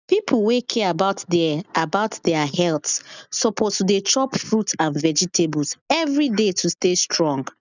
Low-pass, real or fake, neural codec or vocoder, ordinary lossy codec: 7.2 kHz; real; none; none